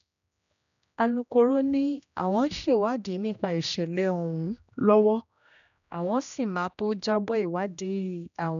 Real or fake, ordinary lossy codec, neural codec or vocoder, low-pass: fake; none; codec, 16 kHz, 1 kbps, X-Codec, HuBERT features, trained on general audio; 7.2 kHz